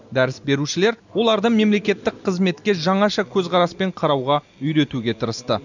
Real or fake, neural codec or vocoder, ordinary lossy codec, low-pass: real; none; none; 7.2 kHz